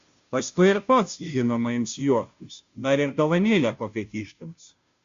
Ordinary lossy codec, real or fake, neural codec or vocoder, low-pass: Opus, 64 kbps; fake; codec, 16 kHz, 0.5 kbps, FunCodec, trained on Chinese and English, 25 frames a second; 7.2 kHz